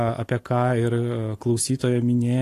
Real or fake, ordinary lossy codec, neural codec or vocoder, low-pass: real; AAC, 48 kbps; none; 14.4 kHz